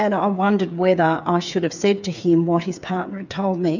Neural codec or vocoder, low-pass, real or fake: codec, 16 kHz, 8 kbps, FreqCodec, smaller model; 7.2 kHz; fake